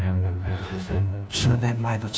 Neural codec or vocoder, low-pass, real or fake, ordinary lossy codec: codec, 16 kHz, 1 kbps, FunCodec, trained on LibriTTS, 50 frames a second; none; fake; none